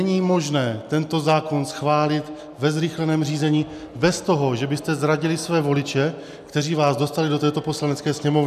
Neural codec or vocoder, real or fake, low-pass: none; real; 14.4 kHz